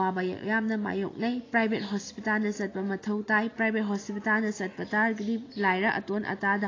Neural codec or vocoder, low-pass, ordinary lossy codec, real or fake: none; 7.2 kHz; AAC, 32 kbps; real